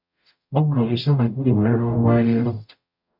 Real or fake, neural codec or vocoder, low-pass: fake; codec, 44.1 kHz, 0.9 kbps, DAC; 5.4 kHz